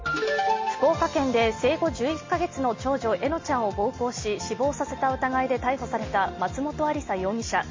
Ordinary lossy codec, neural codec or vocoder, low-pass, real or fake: MP3, 32 kbps; none; 7.2 kHz; real